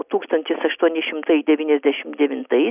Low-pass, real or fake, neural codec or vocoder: 3.6 kHz; real; none